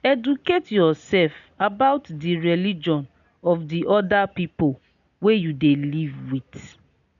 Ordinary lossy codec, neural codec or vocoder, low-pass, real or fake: none; none; 7.2 kHz; real